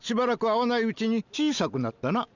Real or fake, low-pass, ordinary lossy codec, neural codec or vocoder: real; 7.2 kHz; none; none